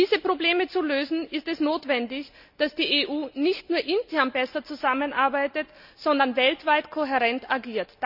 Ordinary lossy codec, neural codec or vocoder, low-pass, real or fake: none; none; 5.4 kHz; real